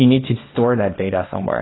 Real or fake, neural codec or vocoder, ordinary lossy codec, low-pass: fake; codec, 24 kHz, 0.9 kbps, WavTokenizer, small release; AAC, 16 kbps; 7.2 kHz